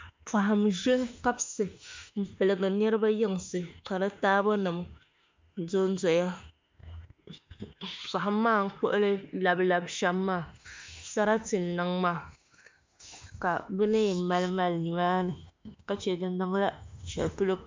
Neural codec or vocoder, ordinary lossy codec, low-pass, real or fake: autoencoder, 48 kHz, 32 numbers a frame, DAC-VAE, trained on Japanese speech; MP3, 64 kbps; 7.2 kHz; fake